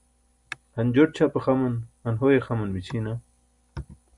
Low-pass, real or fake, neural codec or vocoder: 10.8 kHz; real; none